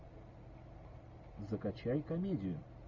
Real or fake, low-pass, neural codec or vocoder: real; 7.2 kHz; none